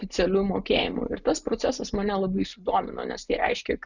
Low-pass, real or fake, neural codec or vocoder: 7.2 kHz; real; none